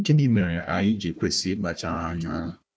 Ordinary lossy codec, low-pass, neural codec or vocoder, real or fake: none; none; codec, 16 kHz, 1 kbps, FunCodec, trained on Chinese and English, 50 frames a second; fake